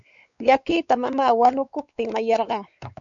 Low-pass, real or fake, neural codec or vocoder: 7.2 kHz; fake; codec, 16 kHz, 4 kbps, X-Codec, WavLM features, trained on Multilingual LibriSpeech